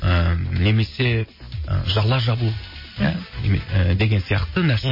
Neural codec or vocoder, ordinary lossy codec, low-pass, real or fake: none; MP3, 24 kbps; 5.4 kHz; real